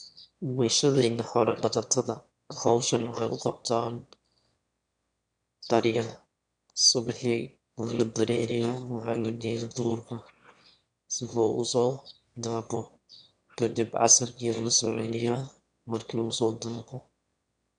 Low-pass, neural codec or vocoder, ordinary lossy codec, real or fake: 9.9 kHz; autoencoder, 22.05 kHz, a latent of 192 numbers a frame, VITS, trained on one speaker; none; fake